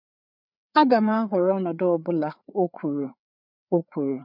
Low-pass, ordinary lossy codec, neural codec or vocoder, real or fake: 5.4 kHz; none; codec, 16 kHz, 4 kbps, FreqCodec, larger model; fake